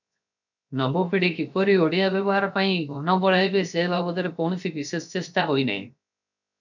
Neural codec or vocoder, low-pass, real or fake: codec, 16 kHz, 0.7 kbps, FocalCodec; 7.2 kHz; fake